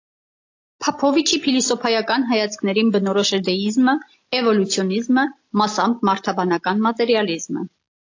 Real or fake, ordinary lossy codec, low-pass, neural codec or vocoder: real; AAC, 48 kbps; 7.2 kHz; none